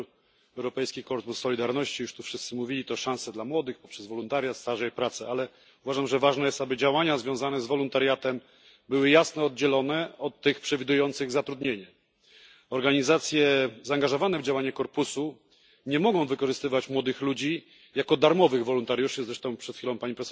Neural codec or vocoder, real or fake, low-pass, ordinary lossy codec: none; real; none; none